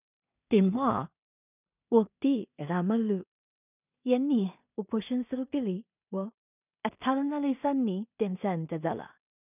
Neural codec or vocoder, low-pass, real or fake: codec, 16 kHz in and 24 kHz out, 0.4 kbps, LongCat-Audio-Codec, two codebook decoder; 3.6 kHz; fake